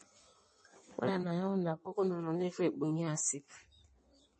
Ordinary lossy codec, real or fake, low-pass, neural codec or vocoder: MP3, 32 kbps; fake; 9.9 kHz; codec, 16 kHz in and 24 kHz out, 1.1 kbps, FireRedTTS-2 codec